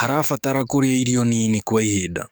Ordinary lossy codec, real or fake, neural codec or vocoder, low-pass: none; fake; codec, 44.1 kHz, 7.8 kbps, DAC; none